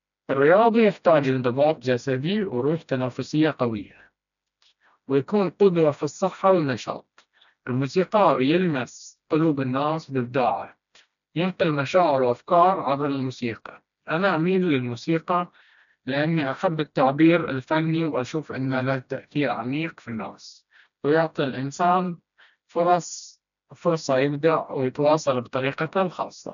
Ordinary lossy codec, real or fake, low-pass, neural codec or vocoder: none; fake; 7.2 kHz; codec, 16 kHz, 1 kbps, FreqCodec, smaller model